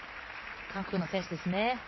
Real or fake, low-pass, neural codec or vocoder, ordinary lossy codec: real; 7.2 kHz; none; MP3, 24 kbps